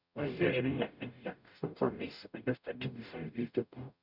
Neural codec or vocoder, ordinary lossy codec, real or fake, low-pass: codec, 44.1 kHz, 0.9 kbps, DAC; none; fake; 5.4 kHz